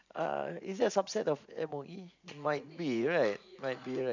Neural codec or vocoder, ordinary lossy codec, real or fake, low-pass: vocoder, 44.1 kHz, 80 mel bands, Vocos; none; fake; 7.2 kHz